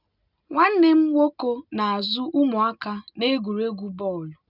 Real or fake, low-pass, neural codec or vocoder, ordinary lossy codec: real; 5.4 kHz; none; none